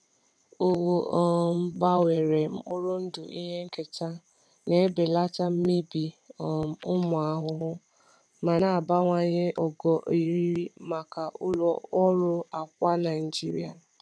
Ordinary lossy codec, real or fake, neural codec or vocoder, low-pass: none; fake; vocoder, 22.05 kHz, 80 mel bands, WaveNeXt; none